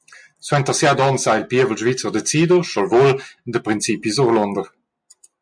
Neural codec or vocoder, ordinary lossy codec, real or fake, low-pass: none; MP3, 64 kbps; real; 9.9 kHz